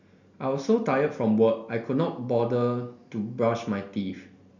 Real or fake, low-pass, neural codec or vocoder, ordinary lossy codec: real; 7.2 kHz; none; none